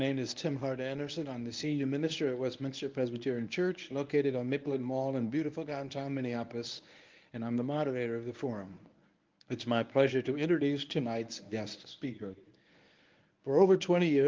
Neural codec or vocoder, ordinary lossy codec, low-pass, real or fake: codec, 24 kHz, 0.9 kbps, WavTokenizer, medium speech release version 1; Opus, 24 kbps; 7.2 kHz; fake